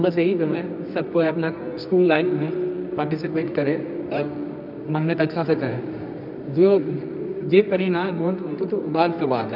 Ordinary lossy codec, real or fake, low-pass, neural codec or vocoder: none; fake; 5.4 kHz; codec, 24 kHz, 0.9 kbps, WavTokenizer, medium music audio release